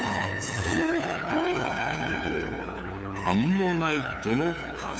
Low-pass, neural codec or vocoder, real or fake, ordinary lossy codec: none; codec, 16 kHz, 2 kbps, FunCodec, trained on LibriTTS, 25 frames a second; fake; none